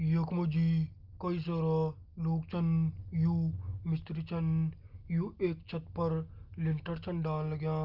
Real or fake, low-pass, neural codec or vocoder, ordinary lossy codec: real; 5.4 kHz; none; Opus, 32 kbps